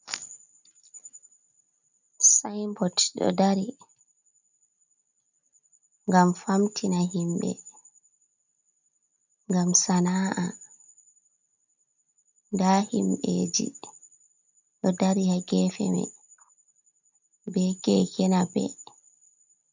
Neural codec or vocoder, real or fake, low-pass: none; real; 7.2 kHz